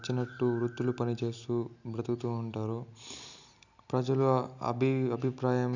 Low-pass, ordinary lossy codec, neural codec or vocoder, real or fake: 7.2 kHz; none; none; real